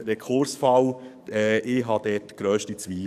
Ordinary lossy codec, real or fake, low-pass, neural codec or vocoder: none; fake; 14.4 kHz; codec, 44.1 kHz, 7.8 kbps, DAC